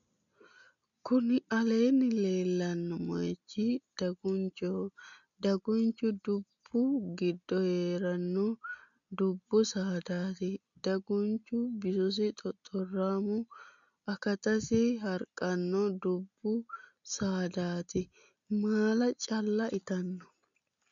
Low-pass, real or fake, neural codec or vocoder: 7.2 kHz; real; none